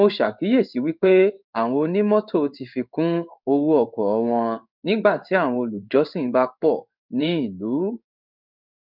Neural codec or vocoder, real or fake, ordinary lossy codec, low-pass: codec, 16 kHz in and 24 kHz out, 1 kbps, XY-Tokenizer; fake; none; 5.4 kHz